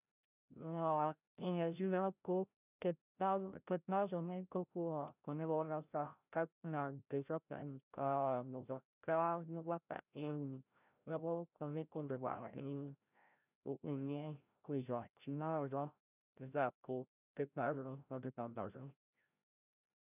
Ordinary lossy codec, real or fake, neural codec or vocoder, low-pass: none; fake; codec, 16 kHz, 0.5 kbps, FreqCodec, larger model; 3.6 kHz